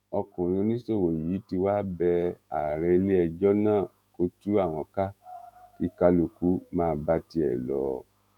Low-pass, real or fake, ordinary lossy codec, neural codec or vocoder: 19.8 kHz; fake; none; autoencoder, 48 kHz, 128 numbers a frame, DAC-VAE, trained on Japanese speech